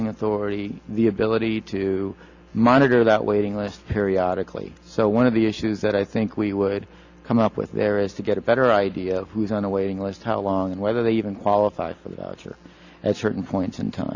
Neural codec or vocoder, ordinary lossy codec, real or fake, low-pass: none; Opus, 64 kbps; real; 7.2 kHz